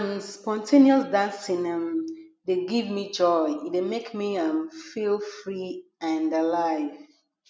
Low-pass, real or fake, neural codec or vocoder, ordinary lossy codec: none; real; none; none